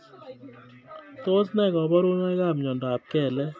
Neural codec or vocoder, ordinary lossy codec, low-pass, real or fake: none; none; none; real